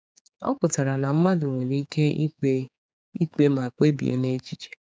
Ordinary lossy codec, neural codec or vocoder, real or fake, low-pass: none; codec, 16 kHz, 4 kbps, X-Codec, HuBERT features, trained on general audio; fake; none